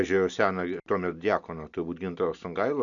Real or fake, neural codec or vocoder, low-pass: real; none; 7.2 kHz